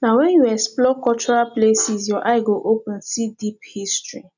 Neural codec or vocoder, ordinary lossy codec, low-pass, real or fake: none; none; 7.2 kHz; real